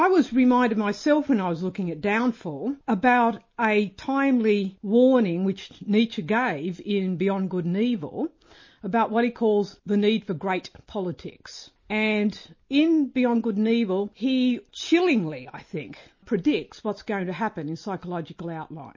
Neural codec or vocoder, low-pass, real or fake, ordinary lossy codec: none; 7.2 kHz; real; MP3, 32 kbps